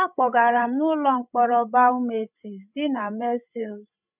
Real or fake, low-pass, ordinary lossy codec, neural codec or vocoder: fake; 3.6 kHz; none; codec, 16 kHz, 16 kbps, FreqCodec, larger model